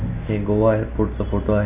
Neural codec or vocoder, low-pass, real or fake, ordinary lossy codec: none; 3.6 kHz; real; AAC, 32 kbps